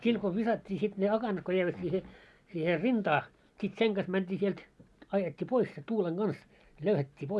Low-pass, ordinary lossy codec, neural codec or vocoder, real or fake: none; none; none; real